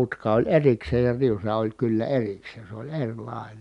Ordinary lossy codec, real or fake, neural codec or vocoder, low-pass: none; real; none; 10.8 kHz